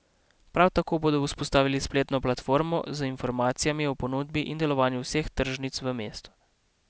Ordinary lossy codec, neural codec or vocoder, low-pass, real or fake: none; none; none; real